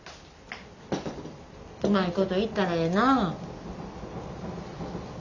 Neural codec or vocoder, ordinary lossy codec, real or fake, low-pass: none; none; real; 7.2 kHz